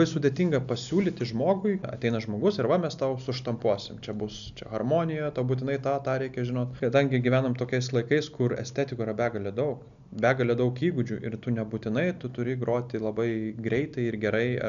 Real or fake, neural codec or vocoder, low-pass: real; none; 7.2 kHz